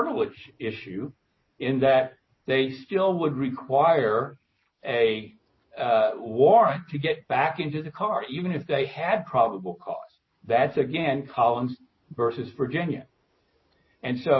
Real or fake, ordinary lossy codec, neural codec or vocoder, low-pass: real; MP3, 24 kbps; none; 7.2 kHz